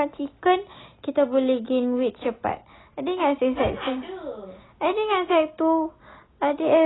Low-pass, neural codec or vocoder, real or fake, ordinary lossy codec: 7.2 kHz; none; real; AAC, 16 kbps